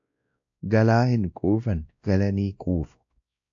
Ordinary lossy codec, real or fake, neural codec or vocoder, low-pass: AAC, 64 kbps; fake; codec, 16 kHz, 1 kbps, X-Codec, WavLM features, trained on Multilingual LibriSpeech; 7.2 kHz